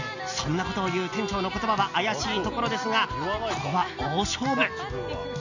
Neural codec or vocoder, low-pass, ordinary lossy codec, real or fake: none; 7.2 kHz; none; real